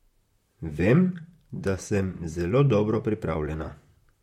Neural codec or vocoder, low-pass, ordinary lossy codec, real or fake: vocoder, 44.1 kHz, 128 mel bands, Pupu-Vocoder; 19.8 kHz; MP3, 64 kbps; fake